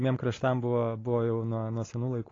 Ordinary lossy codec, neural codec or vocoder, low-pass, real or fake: AAC, 32 kbps; none; 7.2 kHz; real